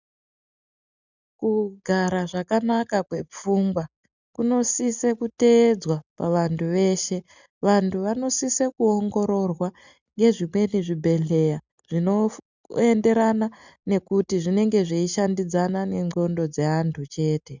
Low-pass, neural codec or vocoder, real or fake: 7.2 kHz; none; real